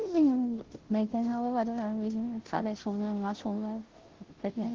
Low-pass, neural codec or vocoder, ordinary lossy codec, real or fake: 7.2 kHz; codec, 16 kHz, 0.5 kbps, FunCodec, trained on Chinese and English, 25 frames a second; Opus, 16 kbps; fake